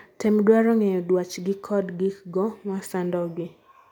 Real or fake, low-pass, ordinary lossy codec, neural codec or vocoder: real; 19.8 kHz; none; none